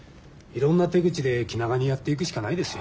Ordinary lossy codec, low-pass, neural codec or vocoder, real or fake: none; none; none; real